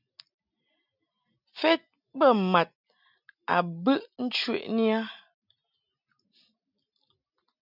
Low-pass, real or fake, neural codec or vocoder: 5.4 kHz; real; none